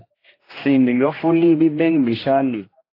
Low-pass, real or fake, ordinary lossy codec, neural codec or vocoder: 5.4 kHz; fake; AAC, 24 kbps; codec, 16 kHz, 1 kbps, X-Codec, HuBERT features, trained on general audio